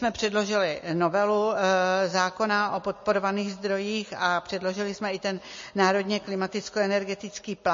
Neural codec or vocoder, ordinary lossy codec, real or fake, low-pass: none; MP3, 32 kbps; real; 7.2 kHz